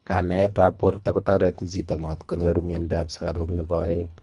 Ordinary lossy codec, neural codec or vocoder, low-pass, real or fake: none; codec, 24 kHz, 1.5 kbps, HILCodec; 10.8 kHz; fake